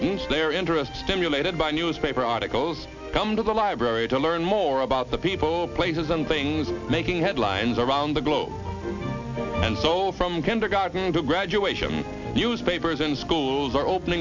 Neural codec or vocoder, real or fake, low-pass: none; real; 7.2 kHz